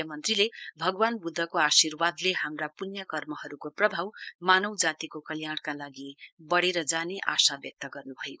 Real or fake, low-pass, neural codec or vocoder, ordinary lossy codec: fake; none; codec, 16 kHz, 4.8 kbps, FACodec; none